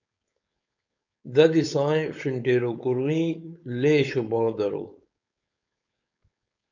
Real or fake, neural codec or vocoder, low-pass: fake; codec, 16 kHz, 4.8 kbps, FACodec; 7.2 kHz